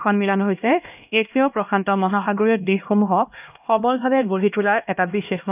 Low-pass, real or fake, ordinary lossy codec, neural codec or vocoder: 3.6 kHz; fake; none; codec, 16 kHz, 1 kbps, X-Codec, HuBERT features, trained on LibriSpeech